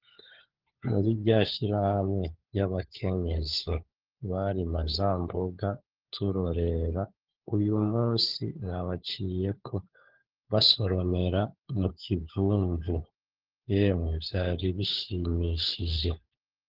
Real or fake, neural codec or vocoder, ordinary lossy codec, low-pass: fake; codec, 16 kHz, 4 kbps, FunCodec, trained on LibriTTS, 50 frames a second; Opus, 16 kbps; 5.4 kHz